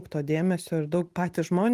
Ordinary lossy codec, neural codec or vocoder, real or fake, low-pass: Opus, 24 kbps; none; real; 14.4 kHz